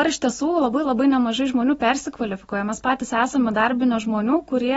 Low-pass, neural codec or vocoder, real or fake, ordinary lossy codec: 19.8 kHz; none; real; AAC, 24 kbps